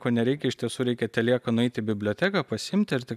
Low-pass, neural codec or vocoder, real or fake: 14.4 kHz; none; real